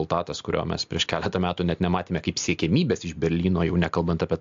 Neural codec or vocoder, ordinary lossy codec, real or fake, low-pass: none; AAC, 64 kbps; real; 7.2 kHz